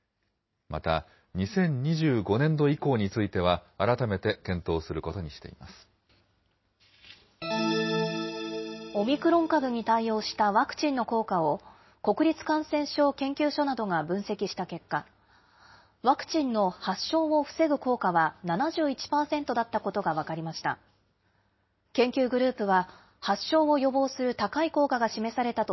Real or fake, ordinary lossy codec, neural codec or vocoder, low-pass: real; MP3, 24 kbps; none; 7.2 kHz